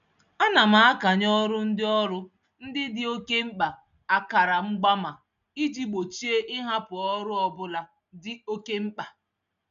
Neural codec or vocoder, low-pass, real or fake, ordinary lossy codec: none; 7.2 kHz; real; none